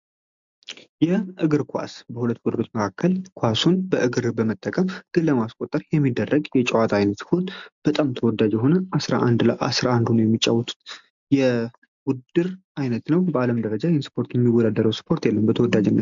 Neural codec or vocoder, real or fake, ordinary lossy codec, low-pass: none; real; MP3, 64 kbps; 7.2 kHz